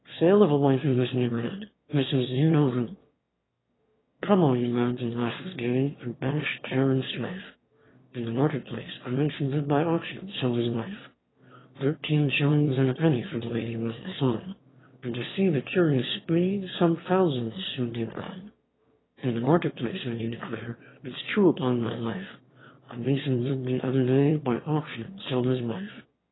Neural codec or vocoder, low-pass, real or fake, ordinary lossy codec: autoencoder, 22.05 kHz, a latent of 192 numbers a frame, VITS, trained on one speaker; 7.2 kHz; fake; AAC, 16 kbps